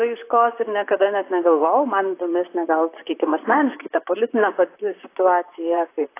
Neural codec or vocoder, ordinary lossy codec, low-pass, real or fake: vocoder, 44.1 kHz, 128 mel bands, Pupu-Vocoder; AAC, 24 kbps; 3.6 kHz; fake